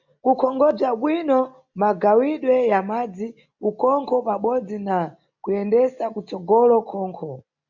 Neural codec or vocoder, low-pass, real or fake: none; 7.2 kHz; real